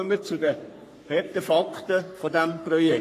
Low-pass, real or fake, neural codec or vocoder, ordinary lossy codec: 14.4 kHz; fake; codec, 44.1 kHz, 3.4 kbps, Pupu-Codec; AAC, 48 kbps